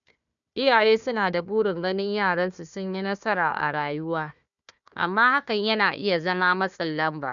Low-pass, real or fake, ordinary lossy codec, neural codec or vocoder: 7.2 kHz; fake; none; codec, 16 kHz, 1 kbps, FunCodec, trained on Chinese and English, 50 frames a second